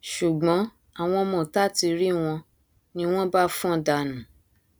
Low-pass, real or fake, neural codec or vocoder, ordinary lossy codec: none; real; none; none